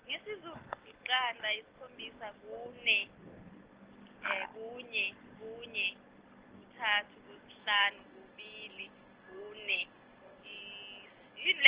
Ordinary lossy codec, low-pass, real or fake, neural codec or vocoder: Opus, 16 kbps; 3.6 kHz; real; none